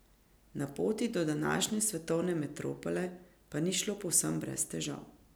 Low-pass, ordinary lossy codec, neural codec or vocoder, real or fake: none; none; none; real